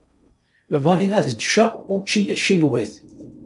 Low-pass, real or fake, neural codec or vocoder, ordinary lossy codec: 10.8 kHz; fake; codec, 16 kHz in and 24 kHz out, 0.6 kbps, FocalCodec, streaming, 4096 codes; MP3, 64 kbps